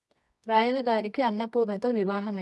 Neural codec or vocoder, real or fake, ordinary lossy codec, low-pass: codec, 24 kHz, 0.9 kbps, WavTokenizer, medium music audio release; fake; none; none